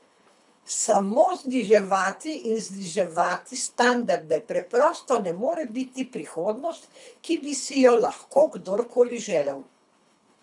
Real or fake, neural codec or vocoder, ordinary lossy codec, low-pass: fake; codec, 24 kHz, 3 kbps, HILCodec; none; 10.8 kHz